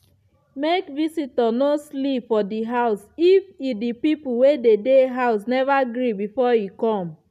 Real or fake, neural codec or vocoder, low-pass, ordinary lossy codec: real; none; 14.4 kHz; none